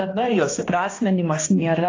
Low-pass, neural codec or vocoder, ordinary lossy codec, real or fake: 7.2 kHz; codec, 16 kHz, 1 kbps, X-Codec, HuBERT features, trained on balanced general audio; AAC, 32 kbps; fake